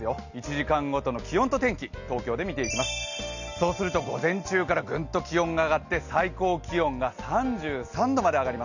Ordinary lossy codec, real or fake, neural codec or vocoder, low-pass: none; real; none; 7.2 kHz